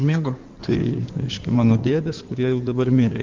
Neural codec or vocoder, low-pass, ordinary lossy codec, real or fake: codec, 16 kHz in and 24 kHz out, 2.2 kbps, FireRedTTS-2 codec; 7.2 kHz; Opus, 24 kbps; fake